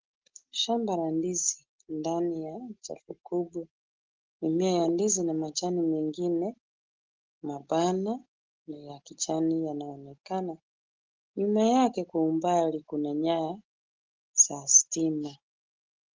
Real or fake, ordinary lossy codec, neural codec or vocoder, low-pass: real; Opus, 16 kbps; none; 7.2 kHz